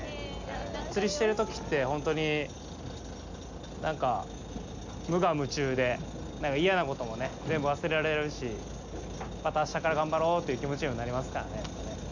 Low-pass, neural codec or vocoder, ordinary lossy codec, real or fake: 7.2 kHz; none; none; real